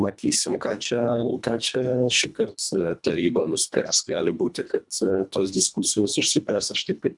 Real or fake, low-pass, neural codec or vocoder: fake; 10.8 kHz; codec, 24 kHz, 1.5 kbps, HILCodec